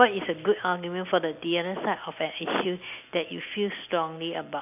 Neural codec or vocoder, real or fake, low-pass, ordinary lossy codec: none; real; 3.6 kHz; none